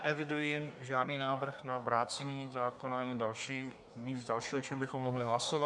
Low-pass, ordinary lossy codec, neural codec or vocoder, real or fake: 10.8 kHz; MP3, 96 kbps; codec, 24 kHz, 1 kbps, SNAC; fake